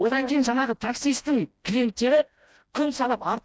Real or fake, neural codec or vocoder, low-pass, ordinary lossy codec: fake; codec, 16 kHz, 1 kbps, FreqCodec, smaller model; none; none